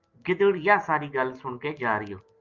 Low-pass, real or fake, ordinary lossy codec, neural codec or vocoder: 7.2 kHz; real; Opus, 24 kbps; none